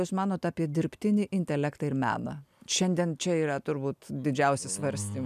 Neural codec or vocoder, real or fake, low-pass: none; real; 14.4 kHz